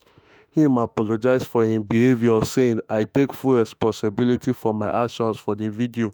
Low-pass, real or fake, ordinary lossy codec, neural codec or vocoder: none; fake; none; autoencoder, 48 kHz, 32 numbers a frame, DAC-VAE, trained on Japanese speech